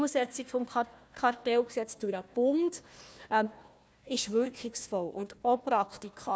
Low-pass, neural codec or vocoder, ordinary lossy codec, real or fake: none; codec, 16 kHz, 1 kbps, FunCodec, trained on Chinese and English, 50 frames a second; none; fake